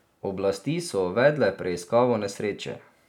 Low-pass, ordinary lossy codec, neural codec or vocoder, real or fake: 19.8 kHz; none; none; real